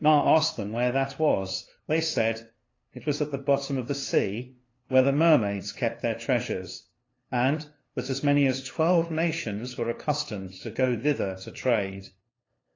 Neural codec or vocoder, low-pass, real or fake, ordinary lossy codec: codec, 16 kHz, 2 kbps, FunCodec, trained on LibriTTS, 25 frames a second; 7.2 kHz; fake; AAC, 32 kbps